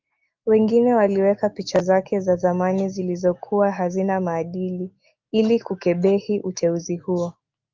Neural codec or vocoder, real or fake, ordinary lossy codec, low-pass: autoencoder, 48 kHz, 128 numbers a frame, DAC-VAE, trained on Japanese speech; fake; Opus, 24 kbps; 7.2 kHz